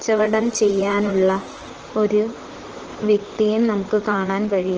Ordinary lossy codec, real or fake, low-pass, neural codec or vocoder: Opus, 16 kbps; fake; 7.2 kHz; vocoder, 44.1 kHz, 128 mel bands, Pupu-Vocoder